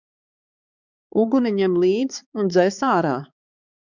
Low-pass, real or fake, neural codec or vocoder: 7.2 kHz; fake; codec, 16 kHz, 4 kbps, X-Codec, HuBERT features, trained on balanced general audio